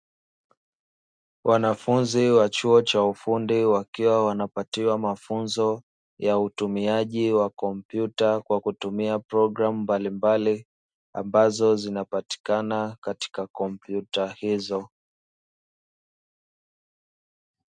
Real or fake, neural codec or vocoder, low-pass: real; none; 9.9 kHz